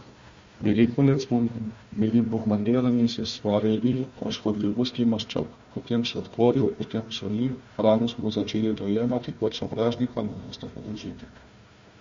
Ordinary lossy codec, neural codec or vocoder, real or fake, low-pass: MP3, 48 kbps; codec, 16 kHz, 1 kbps, FunCodec, trained on Chinese and English, 50 frames a second; fake; 7.2 kHz